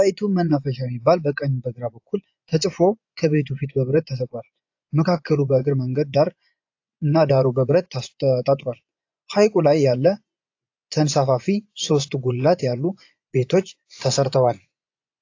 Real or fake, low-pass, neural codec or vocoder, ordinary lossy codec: fake; 7.2 kHz; vocoder, 24 kHz, 100 mel bands, Vocos; AAC, 48 kbps